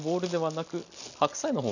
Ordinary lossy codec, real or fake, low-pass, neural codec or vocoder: none; real; 7.2 kHz; none